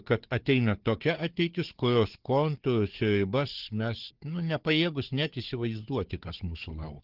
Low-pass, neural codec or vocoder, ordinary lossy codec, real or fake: 5.4 kHz; codec, 16 kHz, 4 kbps, FunCodec, trained on Chinese and English, 50 frames a second; Opus, 16 kbps; fake